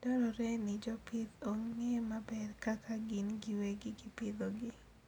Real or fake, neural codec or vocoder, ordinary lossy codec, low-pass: real; none; none; 19.8 kHz